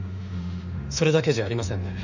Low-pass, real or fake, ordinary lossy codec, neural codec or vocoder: 7.2 kHz; fake; none; autoencoder, 48 kHz, 32 numbers a frame, DAC-VAE, trained on Japanese speech